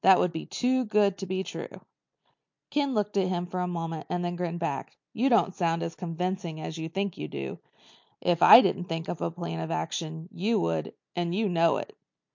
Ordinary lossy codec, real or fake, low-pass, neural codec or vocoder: MP3, 48 kbps; real; 7.2 kHz; none